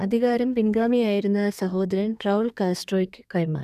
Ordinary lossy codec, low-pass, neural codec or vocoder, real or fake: none; 14.4 kHz; codec, 32 kHz, 1.9 kbps, SNAC; fake